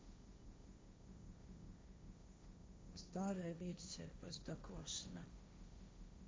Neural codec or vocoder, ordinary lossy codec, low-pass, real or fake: codec, 16 kHz, 1.1 kbps, Voila-Tokenizer; none; none; fake